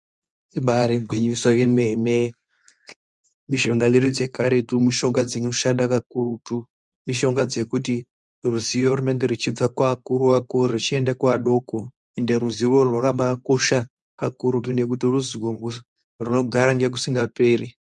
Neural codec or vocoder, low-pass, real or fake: codec, 24 kHz, 0.9 kbps, WavTokenizer, medium speech release version 2; 10.8 kHz; fake